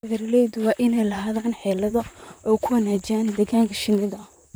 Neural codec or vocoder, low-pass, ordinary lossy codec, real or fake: vocoder, 44.1 kHz, 128 mel bands, Pupu-Vocoder; none; none; fake